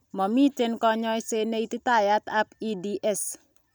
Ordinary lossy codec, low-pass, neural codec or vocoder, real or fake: none; none; none; real